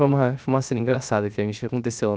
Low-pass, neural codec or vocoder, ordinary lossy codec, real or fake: none; codec, 16 kHz, 0.7 kbps, FocalCodec; none; fake